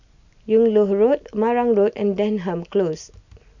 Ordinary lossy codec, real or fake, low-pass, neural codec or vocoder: none; real; 7.2 kHz; none